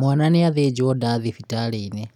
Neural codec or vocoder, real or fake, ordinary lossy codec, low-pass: none; real; none; 19.8 kHz